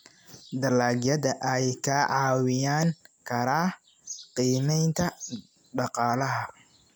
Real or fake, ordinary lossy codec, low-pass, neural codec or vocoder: real; none; none; none